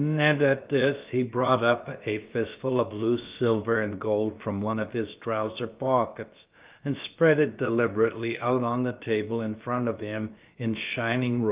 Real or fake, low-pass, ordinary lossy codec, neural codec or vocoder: fake; 3.6 kHz; Opus, 32 kbps; codec, 16 kHz, about 1 kbps, DyCAST, with the encoder's durations